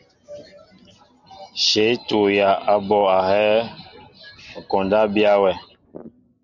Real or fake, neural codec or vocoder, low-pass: real; none; 7.2 kHz